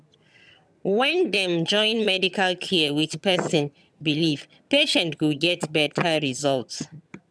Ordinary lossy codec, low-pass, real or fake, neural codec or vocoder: none; none; fake; vocoder, 22.05 kHz, 80 mel bands, HiFi-GAN